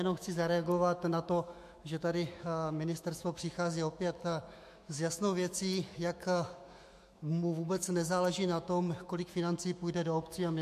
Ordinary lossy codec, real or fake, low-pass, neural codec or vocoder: MP3, 64 kbps; fake; 14.4 kHz; autoencoder, 48 kHz, 128 numbers a frame, DAC-VAE, trained on Japanese speech